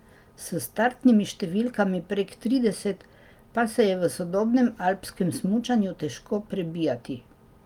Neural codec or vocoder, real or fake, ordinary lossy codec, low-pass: none; real; Opus, 32 kbps; 19.8 kHz